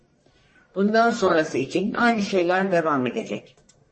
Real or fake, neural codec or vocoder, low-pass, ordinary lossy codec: fake; codec, 44.1 kHz, 1.7 kbps, Pupu-Codec; 10.8 kHz; MP3, 32 kbps